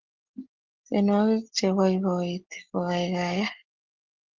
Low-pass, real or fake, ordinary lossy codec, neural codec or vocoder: 7.2 kHz; real; Opus, 16 kbps; none